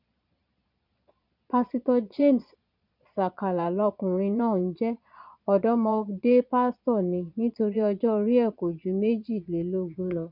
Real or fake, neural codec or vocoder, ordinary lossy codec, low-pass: fake; vocoder, 22.05 kHz, 80 mel bands, Vocos; none; 5.4 kHz